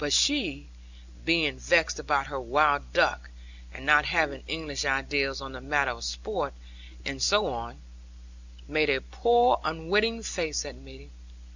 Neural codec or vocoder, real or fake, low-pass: none; real; 7.2 kHz